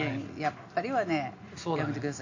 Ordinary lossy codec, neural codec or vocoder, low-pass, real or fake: AAC, 48 kbps; none; 7.2 kHz; real